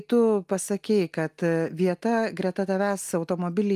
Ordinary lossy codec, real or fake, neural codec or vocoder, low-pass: Opus, 32 kbps; real; none; 14.4 kHz